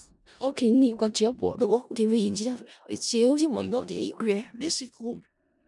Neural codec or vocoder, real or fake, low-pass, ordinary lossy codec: codec, 16 kHz in and 24 kHz out, 0.4 kbps, LongCat-Audio-Codec, four codebook decoder; fake; 10.8 kHz; none